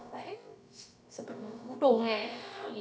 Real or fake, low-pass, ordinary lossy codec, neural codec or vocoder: fake; none; none; codec, 16 kHz, about 1 kbps, DyCAST, with the encoder's durations